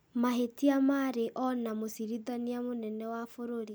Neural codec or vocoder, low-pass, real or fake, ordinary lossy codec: none; none; real; none